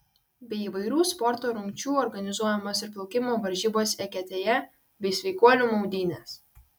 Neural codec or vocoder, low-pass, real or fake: none; 19.8 kHz; real